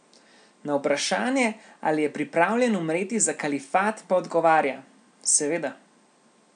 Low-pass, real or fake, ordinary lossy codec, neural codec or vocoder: 9.9 kHz; real; none; none